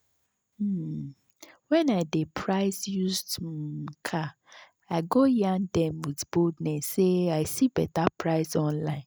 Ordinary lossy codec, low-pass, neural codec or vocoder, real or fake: none; none; none; real